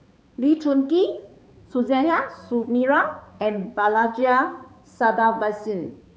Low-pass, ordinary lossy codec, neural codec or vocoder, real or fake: none; none; codec, 16 kHz, 4 kbps, X-Codec, HuBERT features, trained on balanced general audio; fake